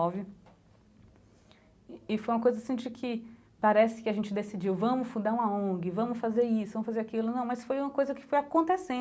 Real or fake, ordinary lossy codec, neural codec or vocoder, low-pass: real; none; none; none